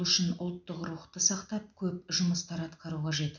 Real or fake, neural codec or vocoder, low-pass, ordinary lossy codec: real; none; 7.2 kHz; none